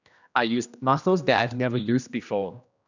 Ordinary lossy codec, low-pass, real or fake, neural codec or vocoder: none; 7.2 kHz; fake; codec, 16 kHz, 1 kbps, X-Codec, HuBERT features, trained on general audio